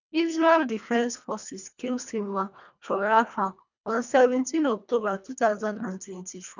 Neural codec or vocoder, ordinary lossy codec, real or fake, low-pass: codec, 24 kHz, 1.5 kbps, HILCodec; none; fake; 7.2 kHz